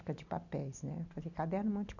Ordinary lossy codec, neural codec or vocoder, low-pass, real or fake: none; none; 7.2 kHz; real